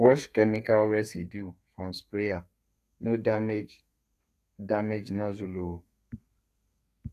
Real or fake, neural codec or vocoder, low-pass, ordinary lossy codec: fake; codec, 32 kHz, 1.9 kbps, SNAC; 14.4 kHz; AAC, 64 kbps